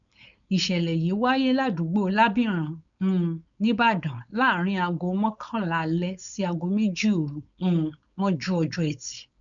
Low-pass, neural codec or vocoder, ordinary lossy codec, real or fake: 7.2 kHz; codec, 16 kHz, 4.8 kbps, FACodec; none; fake